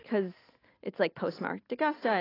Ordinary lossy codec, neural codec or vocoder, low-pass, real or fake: AAC, 24 kbps; none; 5.4 kHz; real